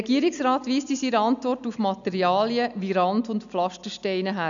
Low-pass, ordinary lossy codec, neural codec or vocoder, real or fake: 7.2 kHz; none; none; real